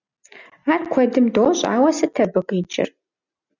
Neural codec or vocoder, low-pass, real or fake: none; 7.2 kHz; real